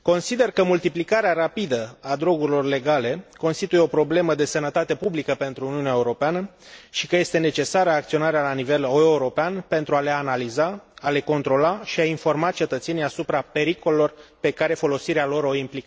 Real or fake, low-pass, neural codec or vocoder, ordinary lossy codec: real; none; none; none